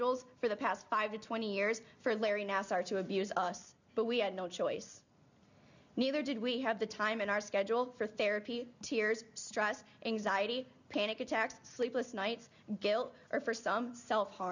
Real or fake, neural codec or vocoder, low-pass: real; none; 7.2 kHz